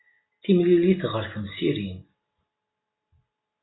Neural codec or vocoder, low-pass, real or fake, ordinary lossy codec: none; 7.2 kHz; real; AAC, 16 kbps